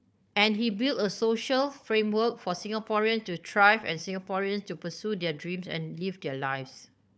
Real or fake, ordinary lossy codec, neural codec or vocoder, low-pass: fake; none; codec, 16 kHz, 4 kbps, FunCodec, trained on Chinese and English, 50 frames a second; none